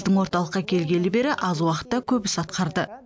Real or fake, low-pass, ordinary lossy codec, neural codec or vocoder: real; none; none; none